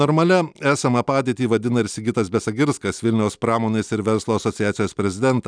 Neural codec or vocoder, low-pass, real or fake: none; 9.9 kHz; real